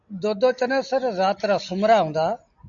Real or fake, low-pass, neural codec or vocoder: real; 7.2 kHz; none